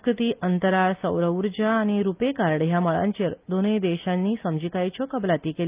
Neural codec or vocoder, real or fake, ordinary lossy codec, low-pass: none; real; Opus, 32 kbps; 3.6 kHz